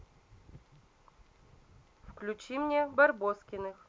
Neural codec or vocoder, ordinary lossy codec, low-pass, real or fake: none; none; none; real